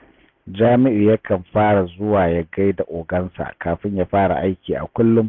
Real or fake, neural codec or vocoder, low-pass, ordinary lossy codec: real; none; 7.2 kHz; none